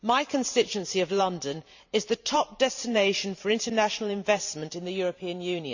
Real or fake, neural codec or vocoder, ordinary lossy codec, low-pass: fake; vocoder, 44.1 kHz, 128 mel bands every 512 samples, BigVGAN v2; none; 7.2 kHz